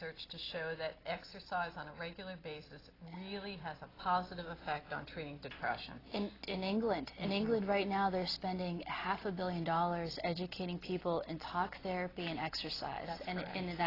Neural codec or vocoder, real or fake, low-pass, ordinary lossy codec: none; real; 5.4 kHz; AAC, 24 kbps